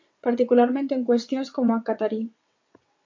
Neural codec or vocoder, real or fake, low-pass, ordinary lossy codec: vocoder, 22.05 kHz, 80 mel bands, WaveNeXt; fake; 7.2 kHz; MP3, 48 kbps